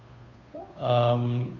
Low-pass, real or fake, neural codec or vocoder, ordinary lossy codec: 7.2 kHz; fake; codec, 16 kHz, 2 kbps, FunCodec, trained on Chinese and English, 25 frames a second; none